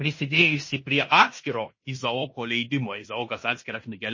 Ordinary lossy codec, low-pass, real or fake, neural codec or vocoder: MP3, 32 kbps; 7.2 kHz; fake; codec, 16 kHz in and 24 kHz out, 0.9 kbps, LongCat-Audio-Codec, fine tuned four codebook decoder